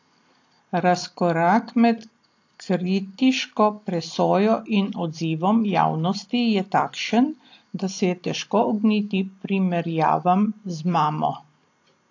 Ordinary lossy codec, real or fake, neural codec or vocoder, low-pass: AAC, 48 kbps; real; none; 7.2 kHz